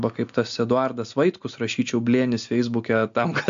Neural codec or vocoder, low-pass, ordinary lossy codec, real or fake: none; 7.2 kHz; AAC, 64 kbps; real